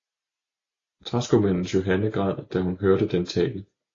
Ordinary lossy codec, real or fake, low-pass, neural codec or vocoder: AAC, 32 kbps; real; 7.2 kHz; none